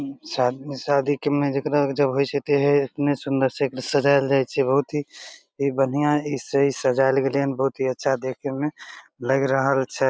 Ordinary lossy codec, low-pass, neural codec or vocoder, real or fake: none; none; none; real